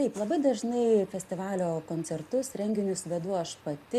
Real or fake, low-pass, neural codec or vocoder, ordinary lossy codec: real; 14.4 kHz; none; AAC, 96 kbps